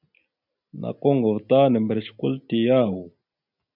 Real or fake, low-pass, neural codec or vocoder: real; 5.4 kHz; none